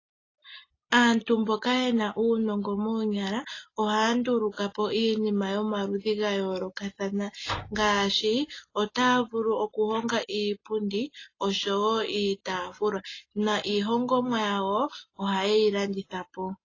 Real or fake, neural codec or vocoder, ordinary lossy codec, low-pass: real; none; AAC, 32 kbps; 7.2 kHz